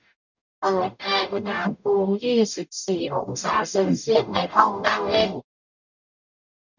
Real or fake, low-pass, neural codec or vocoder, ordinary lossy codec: fake; 7.2 kHz; codec, 44.1 kHz, 0.9 kbps, DAC; none